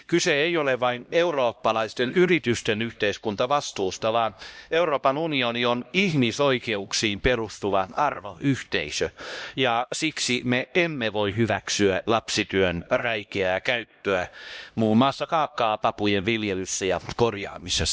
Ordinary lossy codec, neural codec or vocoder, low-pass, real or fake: none; codec, 16 kHz, 1 kbps, X-Codec, HuBERT features, trained on LibriSpeech; none; fake